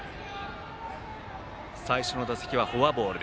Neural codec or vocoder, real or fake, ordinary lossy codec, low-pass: none; real; none; none